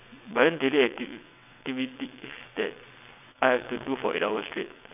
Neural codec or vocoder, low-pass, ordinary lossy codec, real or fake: vocoder, 22.05 kHz, 80 mel bands, WaveNeXt; 3.6 kHz; none; fake